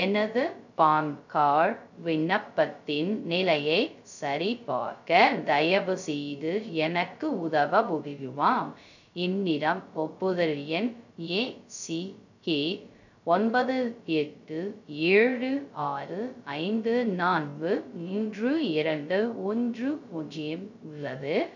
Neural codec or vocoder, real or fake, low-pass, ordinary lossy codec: codec, 16 kHz, 0.2 kbps, FocalCodec; fake; 7.2 kHz; none